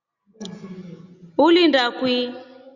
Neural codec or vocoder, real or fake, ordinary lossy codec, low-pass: none; real; Opus, 64 kbps; 7.2 kHz